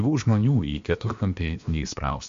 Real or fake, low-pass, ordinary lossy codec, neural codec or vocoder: fake; 7.2 kHz; MP3, 48 kbps; codec, 16 kHz, about 1 kbps, DyCAST, with the encoder's durations